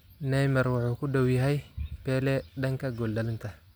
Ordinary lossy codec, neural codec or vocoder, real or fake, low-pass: none; none; real; none